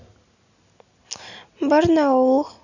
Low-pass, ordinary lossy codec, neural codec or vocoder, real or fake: 7.2 kHz; none; none; real